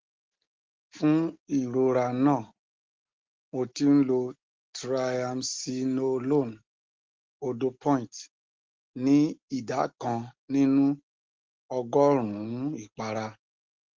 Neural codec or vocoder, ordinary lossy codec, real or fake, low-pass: none; Opus, 16 kbps; real; 7.2 kHz